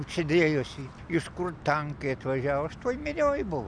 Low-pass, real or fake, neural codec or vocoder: 9.9 kHz; real; none